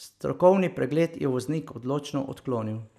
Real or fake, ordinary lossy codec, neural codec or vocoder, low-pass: real; none; none; 14.4 kHz